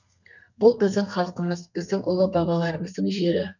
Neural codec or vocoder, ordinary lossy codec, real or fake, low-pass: codec, 32 kHz, 1.9 kbps, SNAC; none; fake; 7.2 kHz